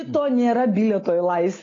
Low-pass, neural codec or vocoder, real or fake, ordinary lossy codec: 7.2 kHz; none; real; AAC, 32 kbps